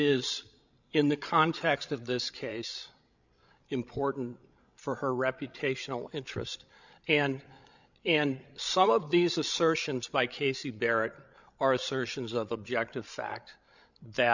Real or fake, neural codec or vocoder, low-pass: fake; codec, 16 kHz, 16 kbps, FreqCodec, larger model; 7.2 kHz